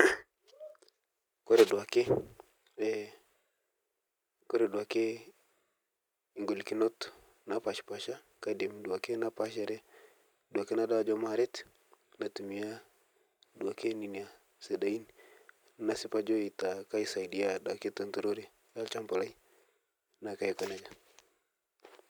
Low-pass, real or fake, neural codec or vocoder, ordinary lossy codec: none; real; none; none